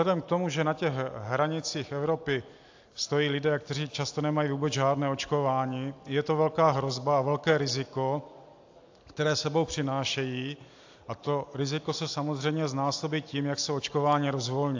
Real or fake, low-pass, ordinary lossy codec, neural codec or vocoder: real; 7.2 kHz; AAC, 48 kbps; none